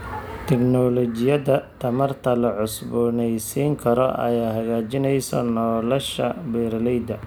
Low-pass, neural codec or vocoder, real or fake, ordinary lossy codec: none; none; real; none